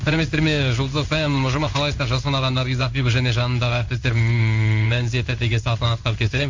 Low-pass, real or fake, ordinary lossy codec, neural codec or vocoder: 7.2 kHz; fake; MP3, 64 kbps; codec, 16 kHz in and 24 kHz out, 1 kbps, XY-Tokenizer